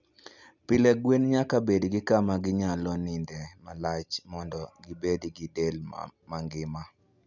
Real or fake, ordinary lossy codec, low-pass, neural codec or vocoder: real; none; 7.2 kHz; none